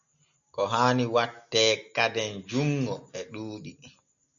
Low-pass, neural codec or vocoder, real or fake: 7.2 kHz; none; real